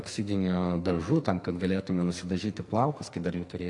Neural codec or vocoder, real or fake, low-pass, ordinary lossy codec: codec, 44.1 kHz, 2.6 kbps, SNAC; fake; 10.8 kHz; AAC, 48 kbps